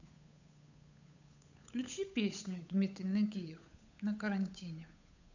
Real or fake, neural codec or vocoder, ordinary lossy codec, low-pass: fake; codec, 16 kHz, 8 kbps, FunCodec, trained on Chinese and English, 25 frames a second; none; 7.2 kHz